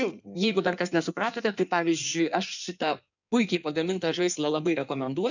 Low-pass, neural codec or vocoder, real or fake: 7.2 kHz; codec, 16 kHz in and 24 kHz out, 1.1 kbps, FireRedTTS-2 codec; fake